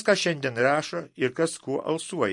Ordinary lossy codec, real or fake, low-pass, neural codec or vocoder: MP3, 48 kbps; real; 10.8 kHz; none